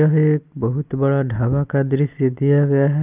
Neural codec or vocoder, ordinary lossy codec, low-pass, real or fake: none; Opus, 32 kbps; 3.6 kHz; real